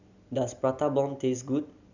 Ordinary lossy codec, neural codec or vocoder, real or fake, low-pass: none; none; real; 7.2 kHz